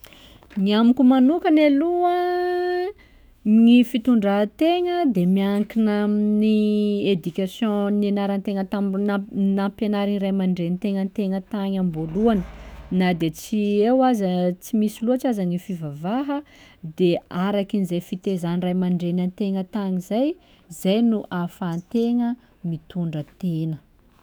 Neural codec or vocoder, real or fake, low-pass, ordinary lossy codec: autoencoder, 48 kHz, 128 numbers a frame, DAC-VAE, trained on Japanese speech; fake; none; none